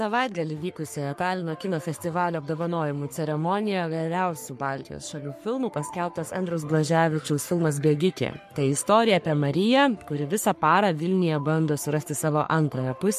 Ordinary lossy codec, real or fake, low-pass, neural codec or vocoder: MP3, 64 kbps; fake; 14.4 kHz; codec, 44.1 kHz, 3.4 kbps, Pupu-Codec